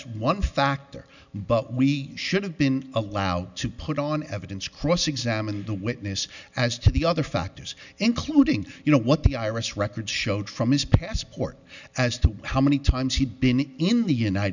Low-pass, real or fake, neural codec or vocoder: 7.2 kHz; real; none